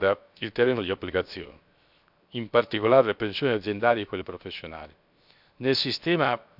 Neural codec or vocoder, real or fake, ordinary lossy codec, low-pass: codec, 16 kHz, 0.7 kbps, FocalCodec; fake; none; 5.4 kHz